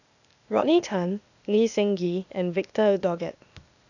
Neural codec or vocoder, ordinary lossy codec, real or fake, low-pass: codec, 16 kHz, 0.8 kbps, ZipCodec; none; fake; 7.2 kHz